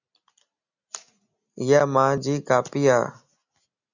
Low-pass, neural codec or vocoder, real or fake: 7.2 kHz; none; real